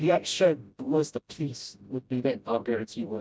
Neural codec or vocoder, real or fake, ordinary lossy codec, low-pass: codec, 16 kHz, 0.5 kbps, FreqCodec, smaller model; fake; none; none